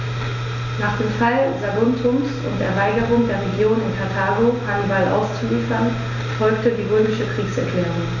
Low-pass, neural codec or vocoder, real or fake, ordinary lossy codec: 7.2 kHz; none; real; none